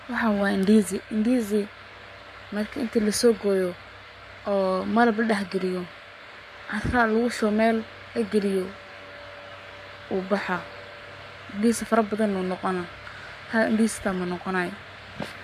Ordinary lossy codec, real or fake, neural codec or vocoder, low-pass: MP3, 96 kbps; fake; codec, 44.1 kHz, 7.8 kbps, Pupu-Codec; 14.4 kHz